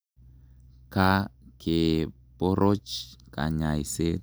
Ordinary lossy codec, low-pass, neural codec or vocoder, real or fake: none; none; none; real